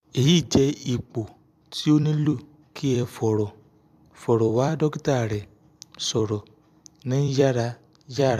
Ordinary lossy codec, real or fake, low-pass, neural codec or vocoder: AAC, 96 kbps; fake; 14.4 kHz; vocoder, 44.1 kHz, 128 mel bands every 256 samples, BigVGAN v2